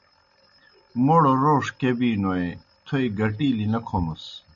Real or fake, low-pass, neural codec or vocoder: real; 7.2 kHz; none